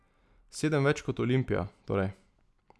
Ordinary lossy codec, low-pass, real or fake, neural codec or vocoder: none; none; real; none